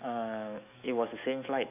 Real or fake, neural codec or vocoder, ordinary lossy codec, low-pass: fake; autoencoder, 48 kHz, 128 numbers a frame, DAC-VAE, trained on Japanese speech; AAC, 32 kbps; 3.6 kHz